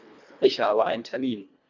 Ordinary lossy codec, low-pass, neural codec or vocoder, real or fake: none; 7.2 kHz; codec, 24 kHz, 1.5 kbps, HILCodec; fake